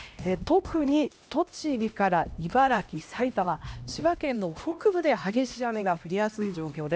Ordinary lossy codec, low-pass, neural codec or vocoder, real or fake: none; none; codec, 16 kHz, 1 kbps, X-Codec, HuBERT features, trained on LibriSpeech; fake